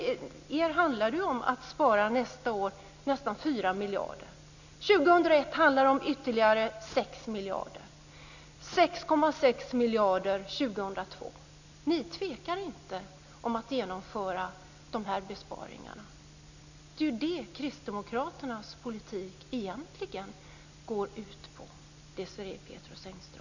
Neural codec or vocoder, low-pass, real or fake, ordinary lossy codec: none; 7.2 kHz; real; none